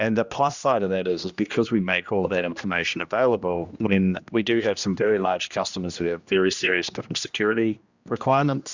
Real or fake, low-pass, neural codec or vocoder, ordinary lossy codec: fake; 7.2 kHz; codec, 16 kHz, 1 kbps, X-Codec, HuBERT features, trained on general audio; Opus, 64 kbps